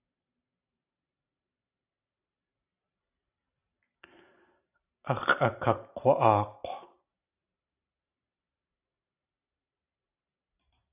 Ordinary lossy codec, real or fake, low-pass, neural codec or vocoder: AAC, 32 kbps; fake; 3.6 kHz; vocoder, 44.1 kHz, 128 mel bands every 256 samples, BigVGAN v2